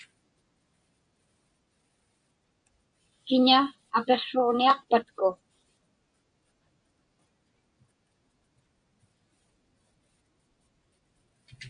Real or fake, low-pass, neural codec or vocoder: real; 9.9 kHz; none